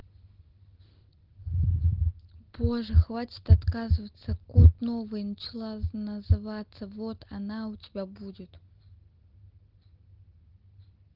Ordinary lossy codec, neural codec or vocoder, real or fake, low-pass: Opus, 16 kbps; none; real; 5.4 kHz